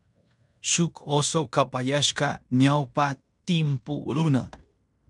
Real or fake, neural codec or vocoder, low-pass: fake; codec, 16 kHz in and 24 kHz out, 0.9 kbps, LongCat-Audio-Codec, fine tuned four codebook decoder; 10.8 kHz